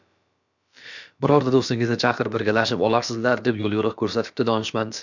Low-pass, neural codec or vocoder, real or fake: 7.2 kHz; codec, 16 kHz, about 1 kbps, DyCAST, with the encoder's durations; fake